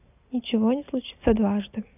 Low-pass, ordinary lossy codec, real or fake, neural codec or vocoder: 3.6 kHz; none; real; none